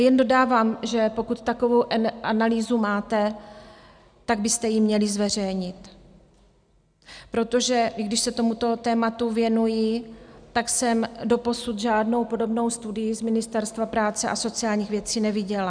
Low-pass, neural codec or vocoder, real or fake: 9.9 kHz; none; real